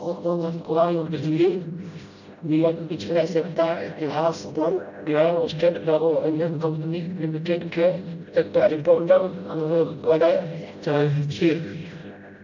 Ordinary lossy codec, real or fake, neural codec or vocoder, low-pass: none; fake; codec, 16 kHz, 0.5 kbps, FreqCodec, smaller model; 7.2 kHz